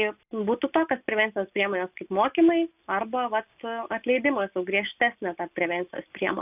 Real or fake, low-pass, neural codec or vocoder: real; 3.6 kHz; none